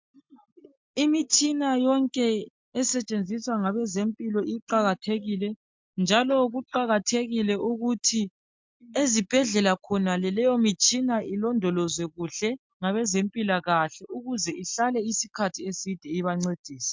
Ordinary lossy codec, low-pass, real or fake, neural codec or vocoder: MP3, 64 kbps; 7.2 kHz; real; none